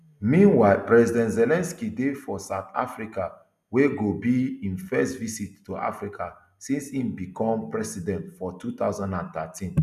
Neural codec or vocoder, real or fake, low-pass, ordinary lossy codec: none; real; 14.4 kHz; none